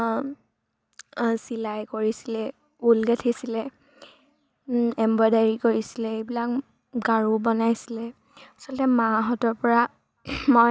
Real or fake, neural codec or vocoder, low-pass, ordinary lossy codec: real; none; none; none